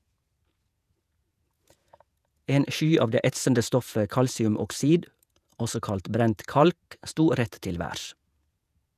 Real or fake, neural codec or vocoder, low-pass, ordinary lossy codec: fake; vocoder, 48 kHz, 128 mel bands, Vocos; 14.4 kHz; none